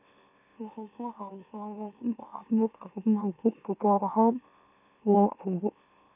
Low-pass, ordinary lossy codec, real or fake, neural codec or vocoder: 3.6 kHz; none; fake; autoencoder, 44.1 kHz, a latent of 192 numbers a frame, MeloTTS